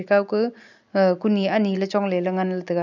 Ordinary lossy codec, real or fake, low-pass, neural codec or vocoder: none; real; 7.2 kHz; none